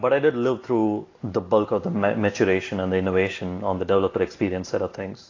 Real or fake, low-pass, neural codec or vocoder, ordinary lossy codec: real; 7.2 kHz; none; AAC, 32 kbps